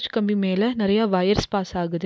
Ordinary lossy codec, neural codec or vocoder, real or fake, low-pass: none; none; real; none